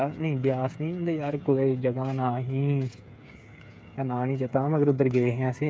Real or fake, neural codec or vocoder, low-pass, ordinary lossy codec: fake; codec, 16 kHz, 8 kbps, FreqCodec, smaller model; none; none